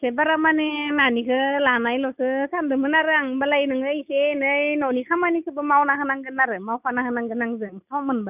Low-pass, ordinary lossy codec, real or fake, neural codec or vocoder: 3.6 kHz; none; real; none